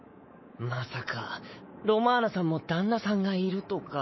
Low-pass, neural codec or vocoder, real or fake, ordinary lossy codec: 7.2 kHz; codec, 24 kHz, 3.1 kbps, DualCodec; fake; MP3, 24 kbps